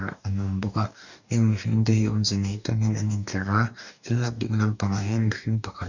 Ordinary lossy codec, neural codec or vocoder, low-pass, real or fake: none; codec, 44.1 kHz, 2.6 kbps, DAC; 7.2 kHz; fake